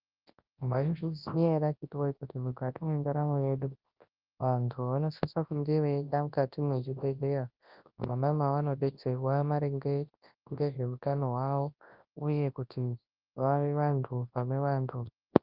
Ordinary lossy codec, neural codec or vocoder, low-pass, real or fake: Opus, 32 kbps; codec, 24 kHz, 0.9 kbps, WavTokenizer, large speech release; 5.4 kHz; fake